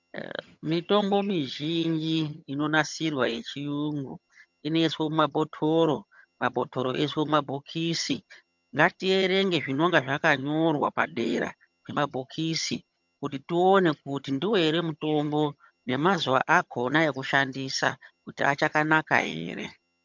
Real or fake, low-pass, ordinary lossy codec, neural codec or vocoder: fake; 7.2 kHz; MP3, 64 kbps; vocoder, 22.05 kHz, 80 mel bands, HiFi-GAN